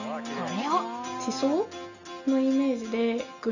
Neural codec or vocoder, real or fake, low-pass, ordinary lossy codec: none; real; 7.2 kHz; none